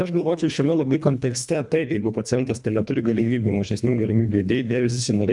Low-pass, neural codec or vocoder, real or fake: 10.8 kHz; codec, 24 kHz, 1.5 kbps, HILCodec; fake